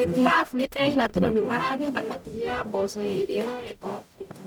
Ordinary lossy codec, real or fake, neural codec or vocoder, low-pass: none; fake; codec, 44.1 kHz, 0.9 kbps, DAC; 19.8 kHz